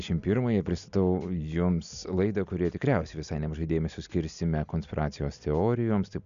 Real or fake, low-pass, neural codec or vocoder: real; 7.2 kHz; none